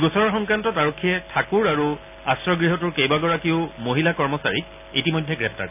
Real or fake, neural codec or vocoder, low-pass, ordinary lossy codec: real; none; 3.6 kHz; none